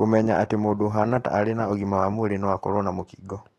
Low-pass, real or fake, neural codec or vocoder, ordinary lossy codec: 19.8 kHz; fake; autoencoder, 48 kHz, 128 numbers a frame, DAC-VAE, trained on Japanese speech; AAC, 32 kbps